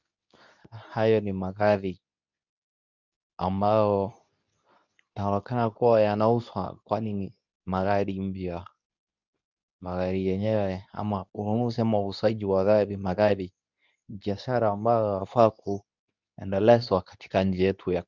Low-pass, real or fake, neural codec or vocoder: 7.2 kHz; fake; codec, 24 kHz, 0.9 kbps, WavTokenizer, medium speech release version 2